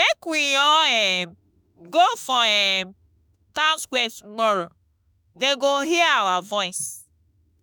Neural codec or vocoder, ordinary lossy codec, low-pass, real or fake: autoencoder, 48 kHz, 32 numbers a frame, DAC-VAE, trained on Japanese speech; none; none; fake